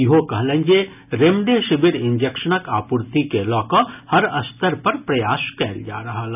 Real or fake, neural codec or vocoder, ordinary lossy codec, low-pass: real; none; none; 3.6 kHz